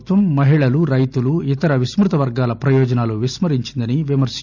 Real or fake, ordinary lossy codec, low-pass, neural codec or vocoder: real; none; 7.2 kHz; none